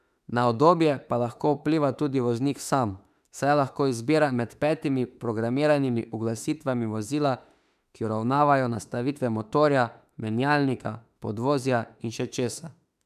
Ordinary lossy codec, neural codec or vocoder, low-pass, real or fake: none; autoencoder, 48 kHz, 32 numbers a frame, DAC-VAE, trained on Japanese speech; 14.4 kHz; fake